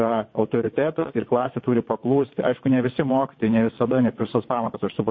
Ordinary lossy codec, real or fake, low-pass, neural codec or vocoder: MP3, 32 kbps; fake; 7.2 kHz; vocoder, 22.05 kHz, 80 mel bands, WaveNeXt